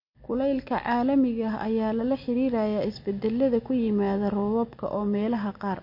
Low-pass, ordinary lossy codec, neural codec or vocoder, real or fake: 5.4 kHz; MP3, 32 kbps; none; real